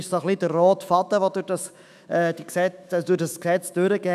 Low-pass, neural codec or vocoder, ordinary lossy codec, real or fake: 14.4 kHz; autoencoder, 48 kHz, 128 numbers a frame, DAC-VAE, trained on Japanese speech; none; fake